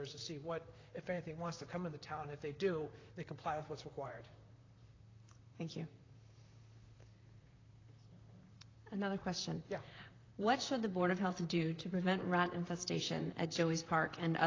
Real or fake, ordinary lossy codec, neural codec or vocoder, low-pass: fake; AAC, 32 kbps; vocoder, 44.1 kHz, 128 mel bands, Pupu-Vocoder; 7.2 kHz